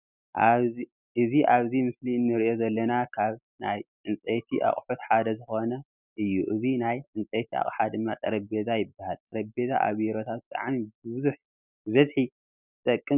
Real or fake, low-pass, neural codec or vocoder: real; 3.6 kHz; none